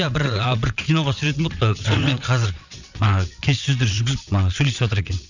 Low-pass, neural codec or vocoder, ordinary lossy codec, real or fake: 7.2 kHz; vocoder, 22.05 kHz, 80 mel bands, WaveNeXt; none; fake